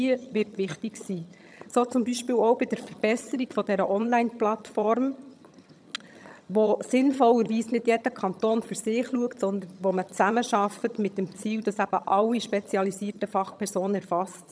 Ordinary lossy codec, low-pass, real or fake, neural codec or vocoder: none; none; fake; vocoder, 22.05 kHz, 80 mel bands, HiFi-GAN